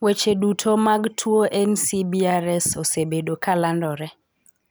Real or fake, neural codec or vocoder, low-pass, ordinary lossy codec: real; none; none; none